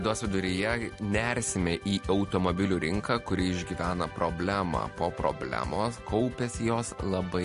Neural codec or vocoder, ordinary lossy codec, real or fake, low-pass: none; MP3, 48 kbps; real; 14.4 kHz